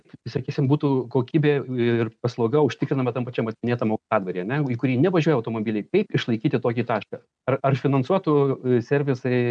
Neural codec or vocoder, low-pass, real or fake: none; 9.9 kHz; real